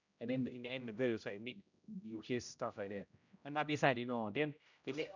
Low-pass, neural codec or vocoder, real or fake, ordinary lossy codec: 7.2 kHz; codec, 16 kHz, 0.5 kbps, X-Codec, HuBERT features, trained on general audio; fake; none